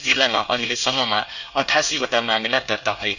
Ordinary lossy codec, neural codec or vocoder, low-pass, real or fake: none; codec, 24 kHz, 1 kbps, SNAC; 7.2 kHz; fake